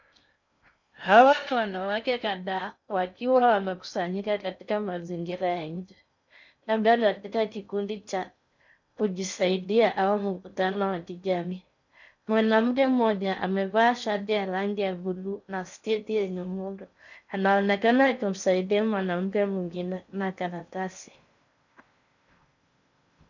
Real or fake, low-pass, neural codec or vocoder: fake; 7.2 kHz; codec, 16 kHz in and 24 kHz out, 0.6 kbps, FocalCodec, streaming, 2048 codes